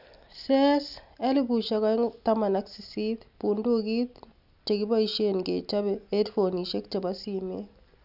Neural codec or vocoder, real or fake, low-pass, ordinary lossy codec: none; real; 5.4 kHz; none